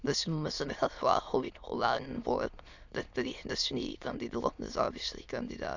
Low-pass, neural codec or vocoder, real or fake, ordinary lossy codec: 7.2 kHz; autoencoder, 22.05 kHz, a latent of 192 numbers a frame, VITS, trained on many speakers; fake; none